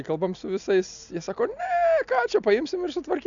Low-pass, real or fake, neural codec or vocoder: 7.2 kHz; real; none